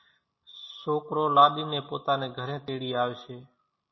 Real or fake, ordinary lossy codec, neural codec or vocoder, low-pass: real; MP3, 24 kbps; none; 7.2 kHz